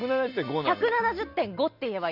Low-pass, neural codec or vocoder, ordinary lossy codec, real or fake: 5.4 kHz; none; none; real